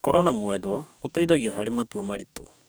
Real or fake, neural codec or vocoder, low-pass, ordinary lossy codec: fake; codec, 44.1 kHz, 2.6 kbps, DAC; none; none